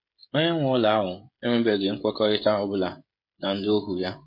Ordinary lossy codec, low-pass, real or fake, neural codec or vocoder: MP3, 32 kbps; 5.4 kHz; fake; codec, 16 kHz, 16 kbps, FreqCodec, smaller model